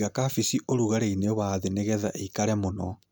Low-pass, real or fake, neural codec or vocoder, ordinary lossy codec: none; real; none; none